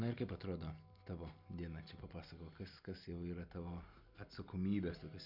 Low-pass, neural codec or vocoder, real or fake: 5.4 kHz; none; real